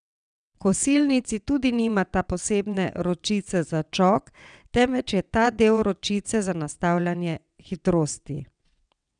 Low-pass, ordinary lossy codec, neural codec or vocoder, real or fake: 9.9 kHz; none; vocoder, 22.05 kHz, 80 mel bands, WaveNeXt; fake